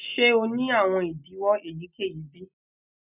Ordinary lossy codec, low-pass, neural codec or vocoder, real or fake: none; 3.6 kHz; none; real